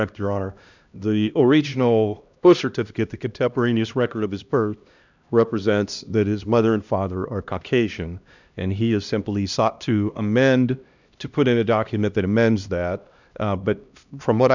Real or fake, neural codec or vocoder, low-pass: fake; codec, 16 kHz, 1 kbps, X-Codec, HuBERT features, trained on LibriSpeech; 7.2 kHz